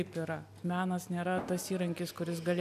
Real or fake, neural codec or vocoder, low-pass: real; none; 14.4 kHz